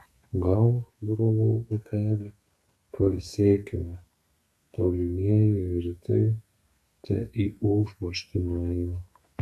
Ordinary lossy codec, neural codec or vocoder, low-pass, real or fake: AAC, 96 kbps; codec, 32 kHz, 1.9 kbps, SNAC; 14.4 kHz; fake